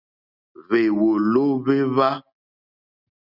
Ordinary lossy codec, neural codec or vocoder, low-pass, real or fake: Opus, 64 kbps; none; 5.4 kHz; real